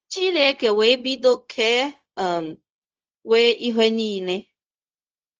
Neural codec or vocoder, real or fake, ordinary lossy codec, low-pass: codec, 16 kHz, 0.4 kbps, LongCat-Audio-Codec; fake; Opus, 24 kbps; 7.2 kHz